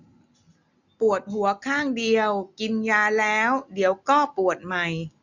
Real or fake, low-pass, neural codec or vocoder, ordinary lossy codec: real; 7.2 kHz; none; none